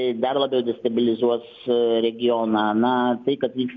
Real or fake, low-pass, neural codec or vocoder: real; 7.2 kHz; none